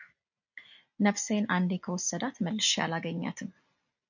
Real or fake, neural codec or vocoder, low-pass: real; none; 7.2 kHz